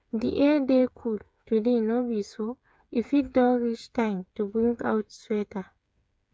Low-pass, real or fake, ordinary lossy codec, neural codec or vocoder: none; fake; none; codec, 16 kHz, 8 kbps, FreqCodec, smaller model